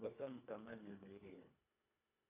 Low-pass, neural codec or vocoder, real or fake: 3.6 kHz; codec, 24 kHz, 1.5 kbps, HILCodec; fake